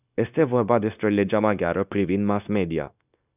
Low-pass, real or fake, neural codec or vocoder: 3.6 kHz; fake; codec, 16 kHz, 0.9 kbps, LongCat-Audio-Codec